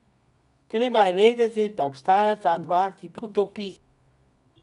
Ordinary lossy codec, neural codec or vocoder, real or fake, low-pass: none; codec, 24 kHz, 0.9 kbps, WavTokenizer, medium music audio release; fake; 10.8 kHz